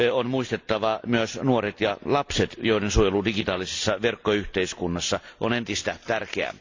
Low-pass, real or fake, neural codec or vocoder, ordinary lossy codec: 7.2 kHz; real; none; AAC, 48 kbps